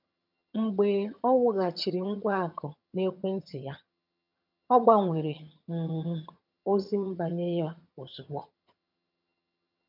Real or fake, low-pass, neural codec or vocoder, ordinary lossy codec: fake; 5.4 kHz; vocoder, 22.05 kHz, 80 mel bands, HiFi-GAN; none